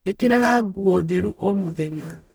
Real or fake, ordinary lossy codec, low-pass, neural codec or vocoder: fake; none; none; codec, 44.1 kHz, 0.9 kbps, DAC